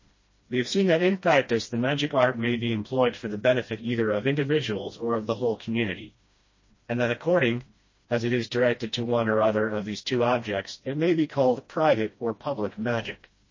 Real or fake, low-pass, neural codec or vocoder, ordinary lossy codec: fake; 7.2 kHz; codec, 16 kHz, 1 kbps, FreqCodec, smaller model; MP3, 32 kbps